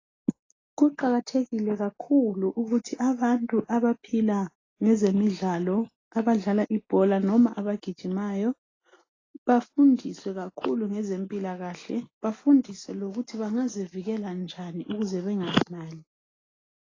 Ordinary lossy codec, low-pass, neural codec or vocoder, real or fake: AAC, 32 kbps; 7.2 kHz; none; real